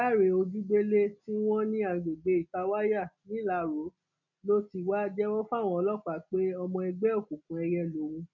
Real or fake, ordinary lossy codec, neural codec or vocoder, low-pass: real; none; none; 7.2 kHz